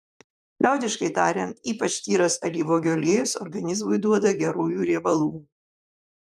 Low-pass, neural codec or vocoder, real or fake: 14.4 kHz; vocoder, 44.1 kHz, 128 mel bands, Pupu-Vocoder; fake